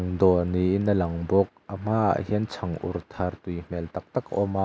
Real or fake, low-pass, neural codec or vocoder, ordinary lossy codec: real; none; none; none